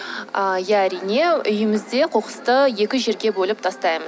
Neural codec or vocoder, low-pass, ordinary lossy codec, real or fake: none; none; none; real